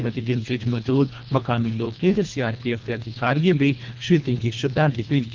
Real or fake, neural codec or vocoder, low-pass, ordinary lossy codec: fake; codec, 24 kHz, 1.5 kbps, HILCodec; 7.2 kHz; Opus, 24 kbps